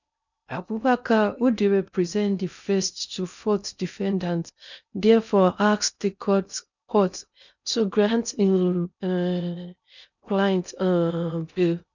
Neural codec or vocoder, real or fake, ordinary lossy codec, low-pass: codec, 16 kHz in and 24 kHz out, 0.6 kbps, FocalCodec, streaming, 2048 codes; fake; none; 7.2 kHz